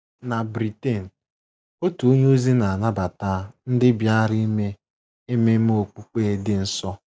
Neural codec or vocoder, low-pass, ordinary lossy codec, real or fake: none; none; none; real